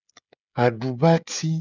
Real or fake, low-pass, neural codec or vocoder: fake; 7.2 kHz; codec, 16 kHz, 16 kbps, FreqCodec, smaller model